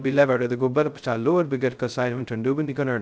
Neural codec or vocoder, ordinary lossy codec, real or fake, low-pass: codec, 16 kHz, 0.2 kbps, FocalCodec; none; fake; none